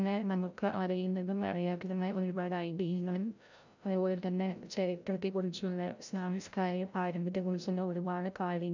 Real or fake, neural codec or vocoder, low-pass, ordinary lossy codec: fake; codec, 16 kHz, 0.5 kbps, FreqCodec, larger model; 7.2 kHz; none